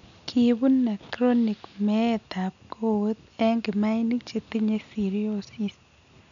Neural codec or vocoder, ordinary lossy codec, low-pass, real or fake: none; none; 7.2 kHz; real